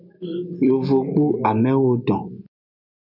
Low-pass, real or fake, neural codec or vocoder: 5.4 kHz; real; none